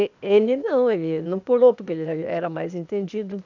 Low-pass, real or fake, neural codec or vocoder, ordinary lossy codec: 7.2 kHz; fake; codec, 16 kHz, 0.8 kbps, ZipCodec; none